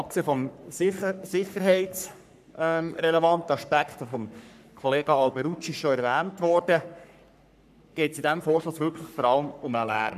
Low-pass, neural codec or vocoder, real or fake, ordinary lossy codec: 14.4 kHz; codec, 44.1 kHz, 3.4 kbps, Pupu-Codec; fake; none